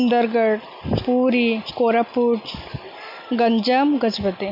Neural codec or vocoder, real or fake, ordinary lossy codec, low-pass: none; real; none; 5.4 kHz